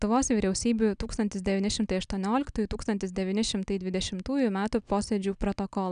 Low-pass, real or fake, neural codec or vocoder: 9.9 kHz; real; none